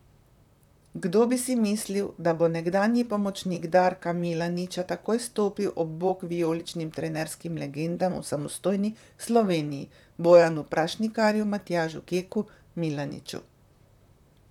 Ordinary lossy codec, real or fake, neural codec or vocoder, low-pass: none; fake; vocoder, 44.1 kHz, 128 mel bands, Pupu-Vocoder; 19.8 kHz